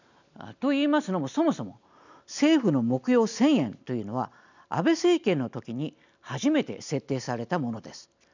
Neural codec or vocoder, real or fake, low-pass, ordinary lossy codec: none; real; 7.2 kHz; none